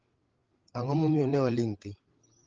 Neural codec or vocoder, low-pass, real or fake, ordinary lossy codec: codec, 16 kHz, 8 kbps, FreqCodec, larger model; 7.2 kHz; fake; Opus, 16 kbps